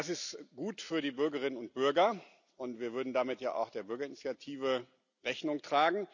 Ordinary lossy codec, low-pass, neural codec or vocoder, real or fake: none; 7.2 kHz; none; real